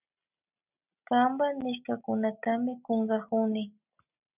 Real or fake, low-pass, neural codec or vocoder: real; 3.6 kHz; none